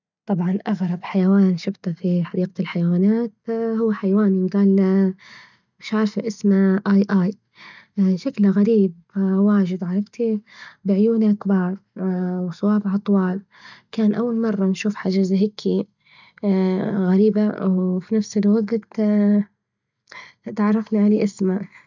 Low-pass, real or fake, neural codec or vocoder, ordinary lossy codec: 7.2 kHz; real; none; none